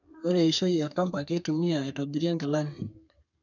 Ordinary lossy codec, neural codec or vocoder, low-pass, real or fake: none; codec, 32 kHz, 1.9 kbps, SNAC; 7.2 kHz; fake